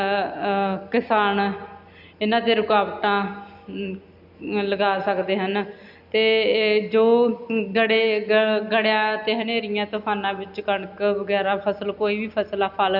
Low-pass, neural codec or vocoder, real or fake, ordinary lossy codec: 5.4 kHz; none; real; AAC, 48 kbps